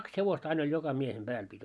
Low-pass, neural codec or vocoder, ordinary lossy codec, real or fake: none; none; none; real